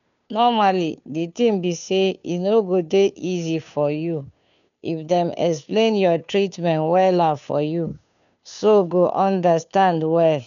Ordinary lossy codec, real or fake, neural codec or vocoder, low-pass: none; fake; codec, 16 kHz, 2 kbps, FunCodec, trained on Chinese and English, 25 frames a second; 7.2 kHz